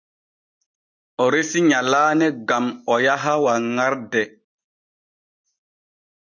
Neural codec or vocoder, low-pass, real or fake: none; 7.2 kHz; real